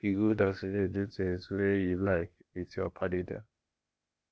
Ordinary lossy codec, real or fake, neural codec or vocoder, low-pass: none; fake; codec, 16 kHz, 0.8 kbps, ZipCodec; none